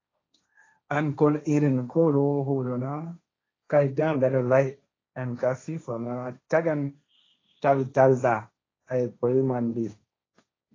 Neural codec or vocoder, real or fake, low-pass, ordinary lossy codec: codec, 16 kHz, 1.1 kbps, Voila-Tokenizer; fake; 7.2 kHz; AAC, 32 kbps